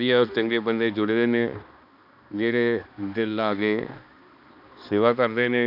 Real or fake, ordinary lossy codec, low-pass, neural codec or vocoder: fake; none; 5.4 kHz; codec, 16 kHz, 2 kbps, X-Codec, HuBERT features, trained on balanced general audio